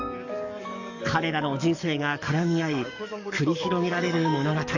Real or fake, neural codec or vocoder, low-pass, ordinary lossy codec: fake; codec, 44.1 kHz, 7.8 kbps, DAC; 7.2 kHz; none